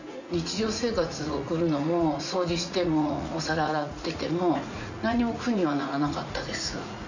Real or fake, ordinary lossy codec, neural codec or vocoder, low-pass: fake; none; vocoder, 44.1 kHz, 80 mel bands, Vocos; 7.2 kHz